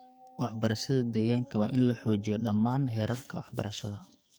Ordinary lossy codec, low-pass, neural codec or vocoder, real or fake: none; none; codec, 44.1 kHz, 2.6 kbps, SNAC; fake